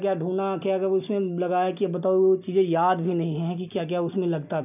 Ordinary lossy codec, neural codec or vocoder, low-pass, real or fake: none; none; 3.6 kHz; real